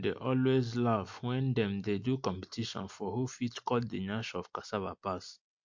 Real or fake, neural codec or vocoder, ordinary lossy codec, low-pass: real; none; MP3, 48 kbps; 7.2 kHz